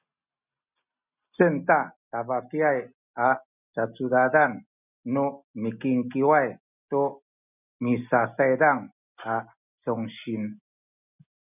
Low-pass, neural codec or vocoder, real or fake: 3.6 kHz; none; real